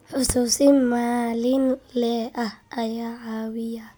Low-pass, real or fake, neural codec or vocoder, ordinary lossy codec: none; real; none; none